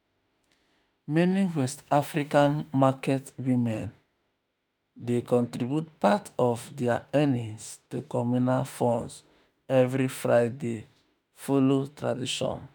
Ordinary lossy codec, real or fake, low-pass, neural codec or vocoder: none; fake; none; autoencoder, 48 kHz, 32 numbers a frame, DAC-VAE, trained on Japanese speech